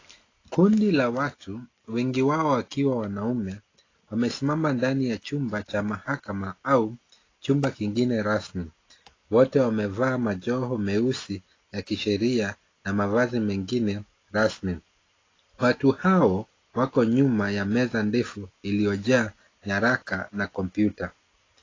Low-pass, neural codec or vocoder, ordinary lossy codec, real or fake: 7.2 kHz; none; AAC, 32 kbps; real